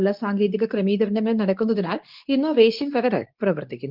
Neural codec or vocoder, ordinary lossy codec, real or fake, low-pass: codec, 24 kHz, 0.9 kbps, WavTokenizer, medium speech release version 2; Opus, 32 kbps; fake; 5.4 kHz